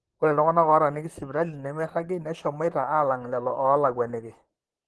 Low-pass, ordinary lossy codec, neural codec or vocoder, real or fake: 10.8 kHz; Opus, 16 kbps; vocoder, 44.1 kHz, 128 mel bands, Pupu-Vocoder; fake